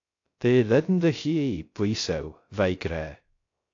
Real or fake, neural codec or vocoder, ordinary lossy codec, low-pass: fake; codec, 16 kHz, 0.3 kbps, FocalCodec; AAC, 48 kbps; 7.2 kHz